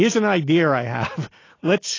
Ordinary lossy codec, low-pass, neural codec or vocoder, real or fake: AAC, 32 kbps; 7.2 kHz; none; real